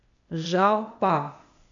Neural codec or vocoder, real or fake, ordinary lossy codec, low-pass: codec, 16 kHz, 0.8 kbps, ZipCodec; fake; AAC, 64 kbps; 7.2 kHz